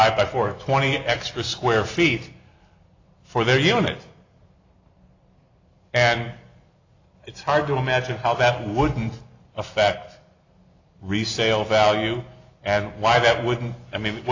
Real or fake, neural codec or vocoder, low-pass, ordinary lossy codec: real; none; 7.2 kHz; AAC, 48 kbps